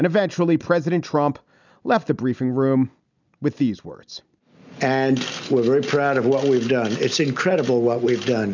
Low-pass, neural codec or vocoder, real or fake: 7.2 kHz; none; real